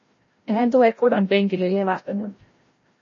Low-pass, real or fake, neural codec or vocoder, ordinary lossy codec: 7.2 kHz; fake; codec, 16 kHz, 0.5 kbps, FreqCodec, larger model; MP3, 32 kbps